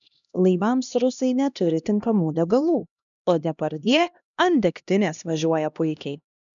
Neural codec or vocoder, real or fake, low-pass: codec, 16 kHz, 1 kbps, X-Codec, HuBERT features, trained on LibriSpeech; fake; 7.2 kHz